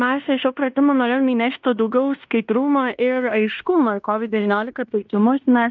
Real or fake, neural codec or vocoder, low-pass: fake; codec, 16 kHz in and 24 kHz out, 0.9 kbps, LongCat-Audio-Codec, fine tuned four codebook decoder; 7.2 kHz